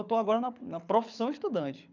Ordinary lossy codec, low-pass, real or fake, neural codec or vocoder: none; 7.2 kHz; fake; codec, 24 kHz, 6 kbps, HILCodec